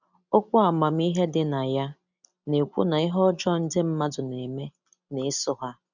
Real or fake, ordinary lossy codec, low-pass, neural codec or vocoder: real; none; 7.2 kHz; none